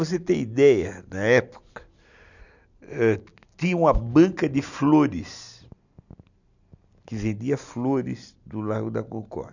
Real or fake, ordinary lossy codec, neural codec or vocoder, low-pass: real; none; none; 7.2 kHz